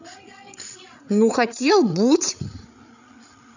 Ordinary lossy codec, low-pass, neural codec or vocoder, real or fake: none; 7.2 kHz; codec, 16 kHz, 8 kbps, FreqCodec, larger model; fake